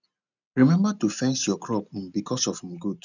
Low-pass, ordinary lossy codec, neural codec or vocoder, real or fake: 7.2 kHz; none; none; real